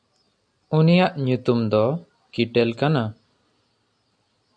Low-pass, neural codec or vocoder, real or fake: 9.9 kHz; none; real